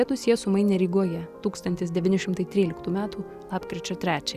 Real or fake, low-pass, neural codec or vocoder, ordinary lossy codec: real; 14.4 kHz; none; Opus, 64 kbps